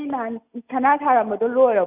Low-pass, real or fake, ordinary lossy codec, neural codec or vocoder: 3.6 kHz; real; none; none